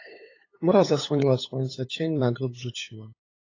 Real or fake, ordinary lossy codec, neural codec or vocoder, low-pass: fake; AAC, 32 kbps; codec, 16 kHz, 8 kbps, FunCodec, trained on LibriTTS, 25 frames a second; 7.2 kHz